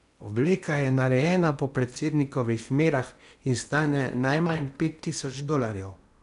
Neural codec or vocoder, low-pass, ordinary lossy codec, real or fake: codec, 16 kHz in and 24 kHz out, 0.8 kbps, FocalCodec, streaming, 65536 codes; 10.8 kHz; none; fake